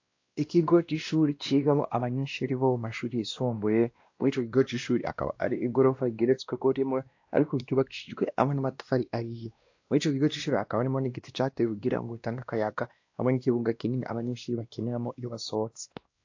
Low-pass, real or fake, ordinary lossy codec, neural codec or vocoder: 7.2 kHz; fake; AAC, 48 kbps; codec, 16 kHz, 1 kbps, X-Codec, WavLM features, trained on Multilingual LibriSpeech